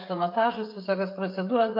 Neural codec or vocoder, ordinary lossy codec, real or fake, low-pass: codec, 16 kHz, 16 kbps, FreqCodec, smaller model; MP3, 32 kbps; fake; 5.4 kHz